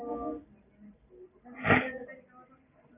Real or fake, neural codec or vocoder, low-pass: real; none; 3.6 kHz